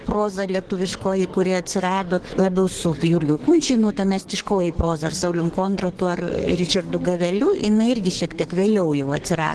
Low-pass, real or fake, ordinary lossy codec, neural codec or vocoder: 10.8 kHz; fake; Opus, 16 kbps; codec, 32 kHz, 1.9 kbps, SNAC